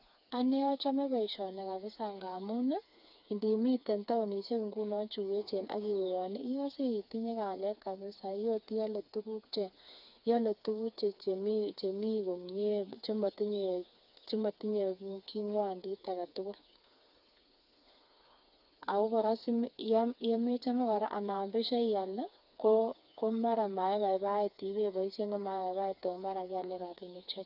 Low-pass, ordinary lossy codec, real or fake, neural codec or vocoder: 5.4 kHz; none; fake; codec, 16 kHz, 4 kbps, FreqCodec, smaller model